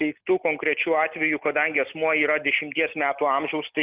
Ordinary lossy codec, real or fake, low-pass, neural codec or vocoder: Opus, 24 kbps; real; 3.6 kHz; none